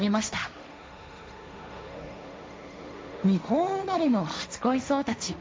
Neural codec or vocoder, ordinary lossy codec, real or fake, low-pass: codec, 16 kHz, 1.1 kbps, Voila-Tokenizer; none; fake; none